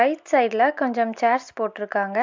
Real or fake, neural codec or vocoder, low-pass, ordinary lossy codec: real; none; 7.2 kHz; MP3, 64 kbps